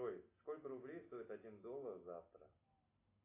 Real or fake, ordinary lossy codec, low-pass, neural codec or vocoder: real; AAC, 24 kbps; 3.6 kHz; none